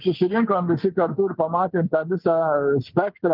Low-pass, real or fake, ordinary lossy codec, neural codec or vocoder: 5.4 kHz; real; Opus, 24 kbps; none